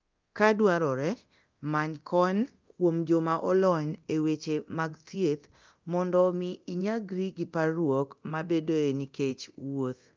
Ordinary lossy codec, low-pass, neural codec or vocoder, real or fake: Opus, 32 kbps; 7.2 kHz; codec, 24 kHz, 0.9 kbps, DualCodec; fake